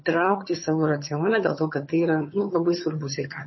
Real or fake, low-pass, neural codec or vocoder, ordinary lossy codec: fake; 7.2 kHz; vocoder, 22.05 kHz, 80 mel bands, HiFi-GAN; MP3, 24 kbps